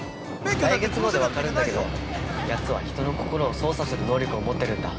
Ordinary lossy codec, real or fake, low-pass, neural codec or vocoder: none; real; none; none